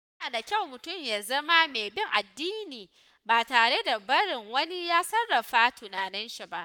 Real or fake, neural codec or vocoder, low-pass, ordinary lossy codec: fake; codec, 44.1 kHz, 7.8 kbps, Pupu-Codec; 19.8 kHz; none